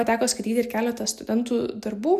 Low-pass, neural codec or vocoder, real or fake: 14.4 kHz; none; real